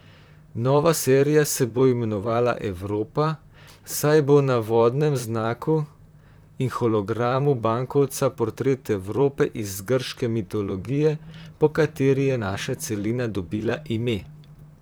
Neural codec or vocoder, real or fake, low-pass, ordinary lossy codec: vocoder, 44.1 kHz, 128 mel bands, Pupu-Vocoder; fake; none; none